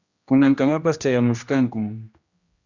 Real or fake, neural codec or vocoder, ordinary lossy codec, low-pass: fake; codec, 16 kHz, 1 kbps, X-Codec, HuBERT features, trained on general audio; Opus, 64 kbps; 7.2 kHz